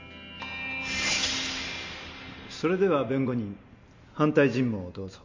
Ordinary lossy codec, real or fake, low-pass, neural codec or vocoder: MP3, 48 kbps; real; 7.2 kHz; none